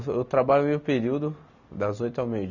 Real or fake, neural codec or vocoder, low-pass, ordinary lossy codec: real; none; 7.2 kHz; none